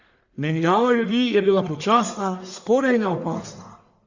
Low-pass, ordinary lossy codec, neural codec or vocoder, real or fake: 7.2 kHz; Opus, 64 kbps; codec, 44.1 kHz, 1.7 kbps, Pupu-Codec; fake